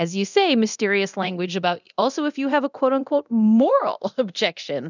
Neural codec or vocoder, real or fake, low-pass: codec, 24 kHz, 0.9 kbps, DualCodec; fake; 7.2 kHz